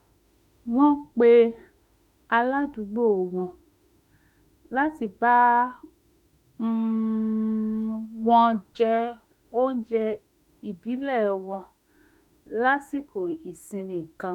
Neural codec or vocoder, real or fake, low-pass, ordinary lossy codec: autoencoder, 48 kHz, 32 numbers a frame, DAC-VAE, trained on Japanese speech; fake; 19.8 kHz; none